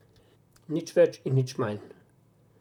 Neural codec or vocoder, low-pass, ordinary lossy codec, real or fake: vocoder, 44.1 kHz, 128 mel bands, Pupu-Vocoder; 19.8 kHz; none; fake